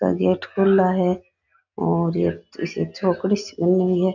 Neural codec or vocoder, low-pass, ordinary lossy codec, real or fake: none; 7.2 kHz; none; real